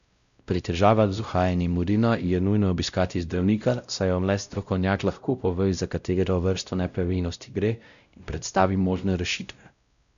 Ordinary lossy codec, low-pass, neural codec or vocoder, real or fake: none; 7.2 kHz; codec, 16 kHz, 0.5 kbps, X-Codec, WavLM features, trained on Multilingual LibriSpeech; fake